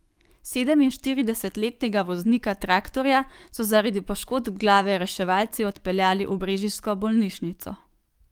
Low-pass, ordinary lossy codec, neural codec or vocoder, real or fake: 19.8 kHz; Opus, 32 kbps; codec, 44.1 kHz, 7.8 kbps, DAC; fake